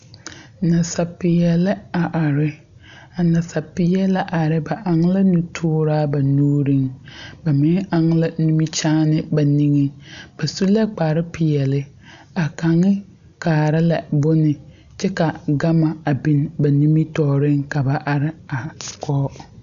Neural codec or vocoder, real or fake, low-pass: none; real; 7.2 kHz